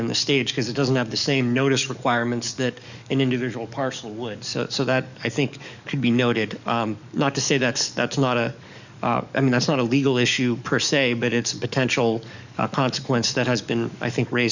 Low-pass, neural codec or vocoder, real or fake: 7.2 kHz; codec, 44.1 kHz, 7.8 kbps, DAC; fake